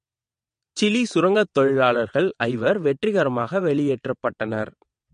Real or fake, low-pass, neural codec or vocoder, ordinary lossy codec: fake; 9.9 kHz; vocoder, 22.05 kHz, 80 mel bands, WaveNeXt; MP3, 48 kbps